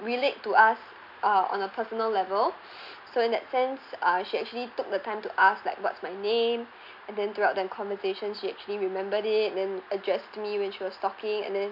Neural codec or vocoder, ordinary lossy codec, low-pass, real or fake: none; MP3, 48 kbps; 5.4 kHz; real